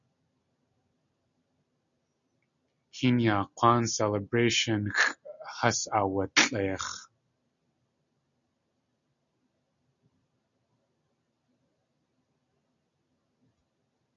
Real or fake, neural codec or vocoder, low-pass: real; none; 7.2 kHz